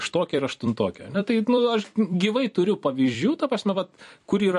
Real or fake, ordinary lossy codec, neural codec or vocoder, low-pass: fake; MP3, 48 kbps; vocoder, 44.1 kHz, 128 mel bands every 256 samples, BigVGAN v2; 14.4 kHz